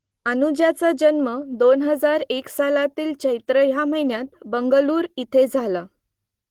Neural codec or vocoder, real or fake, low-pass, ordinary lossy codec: none; real; 19.8 kHz; Opus, 16 kbps